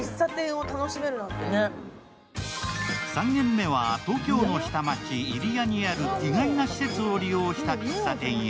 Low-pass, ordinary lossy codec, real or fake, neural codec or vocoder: none; none; real; none